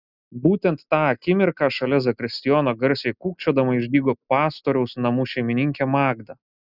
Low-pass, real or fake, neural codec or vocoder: 5.4 kHz; real; none